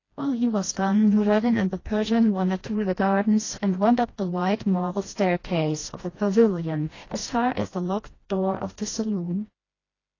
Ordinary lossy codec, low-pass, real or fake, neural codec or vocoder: AAC, 32 kbps; 7.2 kHz; fake; codec, 16 kHz, 1 kbps, FreqCodec, smaller model